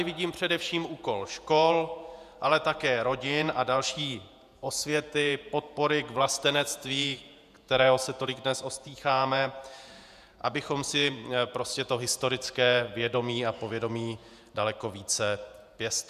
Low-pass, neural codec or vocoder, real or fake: 14.4 kHz; vocoder, 48 kHz, 128 mel bands, Vocos; fake